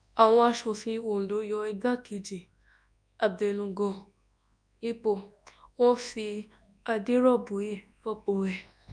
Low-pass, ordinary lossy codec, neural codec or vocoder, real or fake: 9.9 kHz; none; codec, 24 kHz, 0.9 kbps, WavTokenizer, large speech release; fake